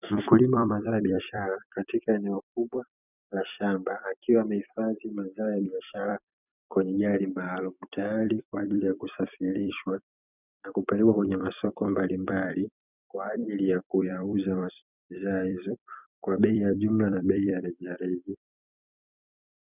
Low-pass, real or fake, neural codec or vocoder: 3.6 kHz; fake; vocoder, 44.1 kHz, 128 mel bands every 256 samples, BigVGAN v2